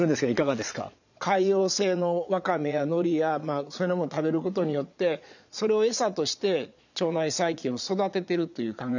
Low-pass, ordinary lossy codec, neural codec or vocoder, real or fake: 7.2 kHz; none; vocoder, 22.05 kHz, 80 mel bands, Vocos; fake